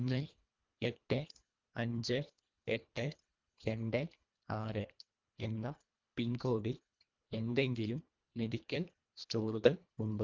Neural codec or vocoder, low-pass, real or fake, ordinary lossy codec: codec, 24 kHz, 1.5 kbps, HILCodec; 7.2 kHz; fake; Opus, 24 kbps